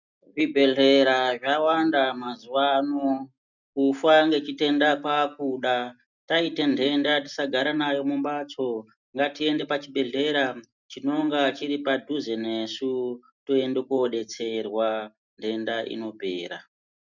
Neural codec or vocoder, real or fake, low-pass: none; real; 7.2 kHz